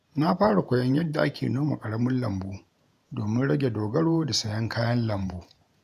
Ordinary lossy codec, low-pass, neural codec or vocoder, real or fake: none; 14.4 kHz; vocoder, 48 kHz, 128 mel bands, Vocos; fake